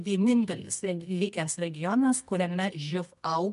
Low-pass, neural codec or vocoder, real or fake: 10.8 kHz; codec, 24 kHz, 0.9 kbps, WavTokenizer, medium music audio release; fake